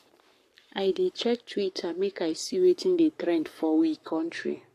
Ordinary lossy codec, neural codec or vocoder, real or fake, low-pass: AAC, 64 kbps; codec, 44.1 kHz, 7.8 kbps, Pupu-Codec; fake; 14.4 kHz